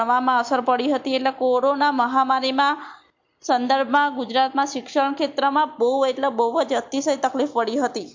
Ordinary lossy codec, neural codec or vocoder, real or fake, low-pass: MP3, 48 kbps; none; real; 7.2 kHz